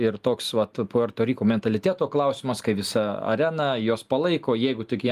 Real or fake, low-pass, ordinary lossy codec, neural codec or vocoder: real; 14.4 kHz; Opus, 32 kbps; none